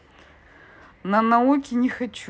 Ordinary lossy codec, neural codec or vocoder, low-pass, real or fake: none; none; none; real